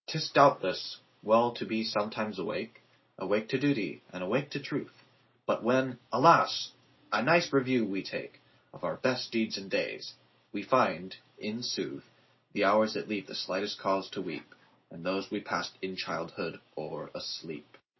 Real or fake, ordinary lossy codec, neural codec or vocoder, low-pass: real; MP3, 24 kbps; none; 7.2 kHz